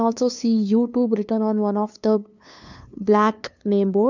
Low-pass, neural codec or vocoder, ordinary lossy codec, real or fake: 7.2 kHz; codec, 16 kHz, 2 kbps, FunCodec, trained on LibriTTS, 25 frames a second; none; fake